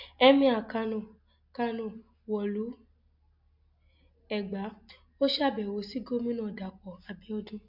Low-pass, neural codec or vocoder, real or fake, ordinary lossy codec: 5.4 kHz; none; real; MP3, 48 kbps